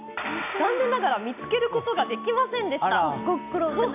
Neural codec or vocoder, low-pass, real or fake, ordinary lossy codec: none; 3.6 kHz; real; AAC, 32 kbps